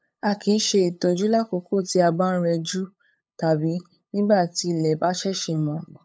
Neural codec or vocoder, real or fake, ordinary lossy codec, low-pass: codec, 16 kHz, 8 kbps, FunCodec, trained on LibriTTS, 25 frames a second; fake; none; none